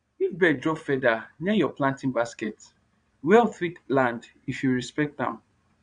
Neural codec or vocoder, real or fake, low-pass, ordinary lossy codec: vocoder, 22.05 kHz, 80 mel bands, Vocos; fake; 9.9 kHz; none